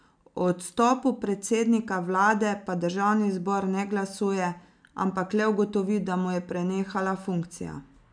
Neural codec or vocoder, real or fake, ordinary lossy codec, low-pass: none; real; none; 9.9 kHz